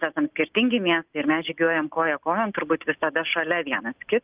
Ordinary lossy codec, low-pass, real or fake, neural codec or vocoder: Opus, 24 kbps; 3.6 kHz; real; none